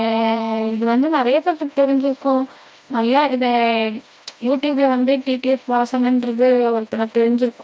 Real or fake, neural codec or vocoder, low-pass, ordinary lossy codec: fake; codec, 16 kHz, 1 kbps, FreqCodec, smaller model; none; none